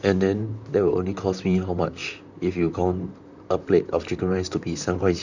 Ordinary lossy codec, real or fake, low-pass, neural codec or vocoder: none; fake; 7.2 kHz; vocoder, 44.1 kHz, 128 mel bands, Pupu-Vocoder